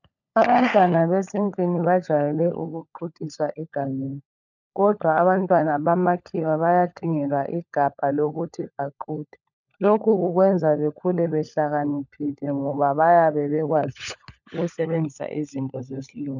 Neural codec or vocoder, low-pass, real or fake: codec, 16 kHz, 16 kbps, FunCodec, trained on LibriTTS, 50 frames a second; 7.2 kHz; fake